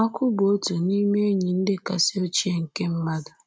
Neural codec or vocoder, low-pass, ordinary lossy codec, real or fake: none; none; none; real